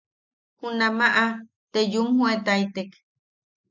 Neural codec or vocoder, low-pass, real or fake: none; 7.2 kHz; real